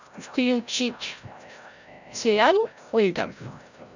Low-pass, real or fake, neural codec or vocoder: 7.2 kHz; fake; codec, 16 kHz, 0.5 kbps, FreqCodec, larger model